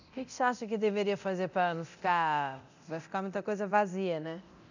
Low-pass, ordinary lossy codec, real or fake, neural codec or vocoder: 7.2 kHz; none; fake; codec, 24 kHz, 0.9 kbps, DualCodec